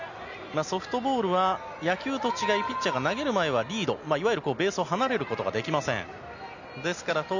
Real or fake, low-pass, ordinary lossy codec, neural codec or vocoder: real; 7.2 kHz; none; none